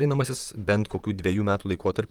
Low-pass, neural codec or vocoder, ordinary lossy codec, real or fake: 19.8 kHz; vocoder, 44.1 kHz, 128 mel bands, Pupu-Vocoder; Opus, 32 kbps; fake